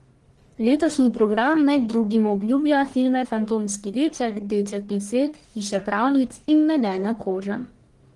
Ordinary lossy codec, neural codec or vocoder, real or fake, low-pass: Opus, 24 kbps; codec, 44.1 kHz, 1.7 kbps, Pupu-Codec; fake; 10.8 kHz